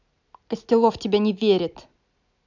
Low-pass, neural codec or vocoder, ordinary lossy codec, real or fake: 7.2 kHz; none; none; real